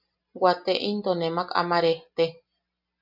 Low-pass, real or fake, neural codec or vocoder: 5.4 kHz; real; none